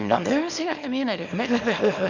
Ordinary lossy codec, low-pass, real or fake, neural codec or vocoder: none; 7.2 kHz; fake; codec, 24 kHz, 0.9 kbps, WavTokenizer, small release